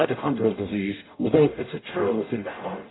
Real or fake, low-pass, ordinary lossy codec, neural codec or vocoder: fake; 7.2 kHz; AAC, 16 kbps; codec, 44.1 kHz, 0.9 kbps, DAC